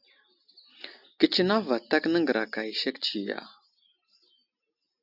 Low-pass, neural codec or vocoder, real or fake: 5.4 kHz; none; real